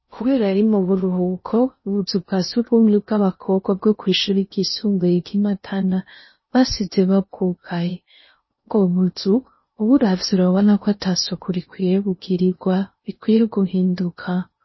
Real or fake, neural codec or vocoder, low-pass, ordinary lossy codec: fake; codec, 16 kHz in and 24 kHz out, 0.6 kbps, FocalCodec, streaming, 2048 codes; 7.2 kHz; MP3, 24 kbps